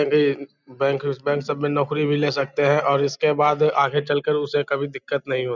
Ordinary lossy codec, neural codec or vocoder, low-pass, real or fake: none; none; 7.2 kHz; real